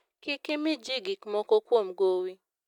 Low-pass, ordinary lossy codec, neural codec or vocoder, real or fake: 19.8 kHz; MP3, 96 kbps; none; real